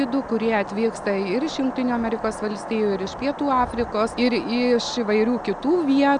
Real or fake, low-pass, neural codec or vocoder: real; 9.9 kHz; none